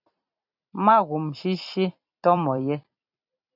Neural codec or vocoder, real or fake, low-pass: vocoder, 44.1 kHz, 128 mel bands every 256 samples, BigVGAN v2; fake; 5.4 kHz